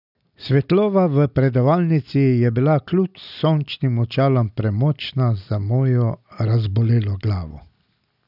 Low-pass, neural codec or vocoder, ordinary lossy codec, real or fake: 5.4 kHz; none; none; real